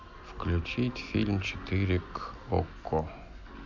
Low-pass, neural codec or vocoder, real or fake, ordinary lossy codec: 7.2 kHz; none; real; none